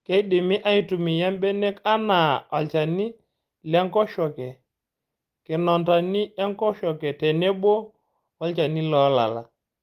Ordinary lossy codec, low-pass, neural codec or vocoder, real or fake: Opus, 24 kbps; 14.4 kHz; none; real